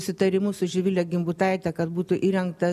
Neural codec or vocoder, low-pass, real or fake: none; 14.4 kHz; real